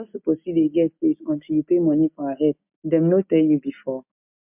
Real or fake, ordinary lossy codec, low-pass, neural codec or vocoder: real; MP3, 32 kbps; 3.6 kHz; none